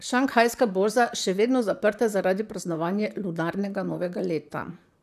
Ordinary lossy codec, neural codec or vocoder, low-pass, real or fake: none; vocoder, 44.1 kHz, 128 mel bands, Pupu-Vocoder; 14.4 kHz; fake